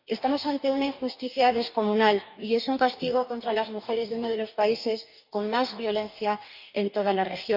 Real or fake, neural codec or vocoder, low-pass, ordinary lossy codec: fake; codec, 44.1 kHz, 2.6 kbps, DAC; 5.4 kHz; none